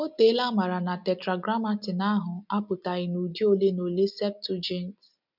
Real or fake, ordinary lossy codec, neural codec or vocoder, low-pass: real; none; none; 5.4 kHz